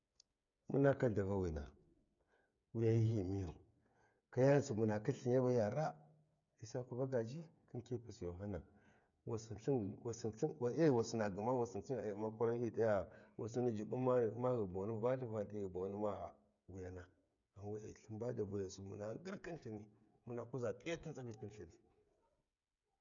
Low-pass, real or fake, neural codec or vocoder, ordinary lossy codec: 7.2 kHz; fake; codec, 16 kHz, 8 kbps, FreqCodec, smaller model; none